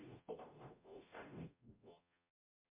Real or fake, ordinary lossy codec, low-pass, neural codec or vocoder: fake; MP3, 32 kbps; 3.6 kHz; codec, 44.1 kHz, 0.9 kbps, DAC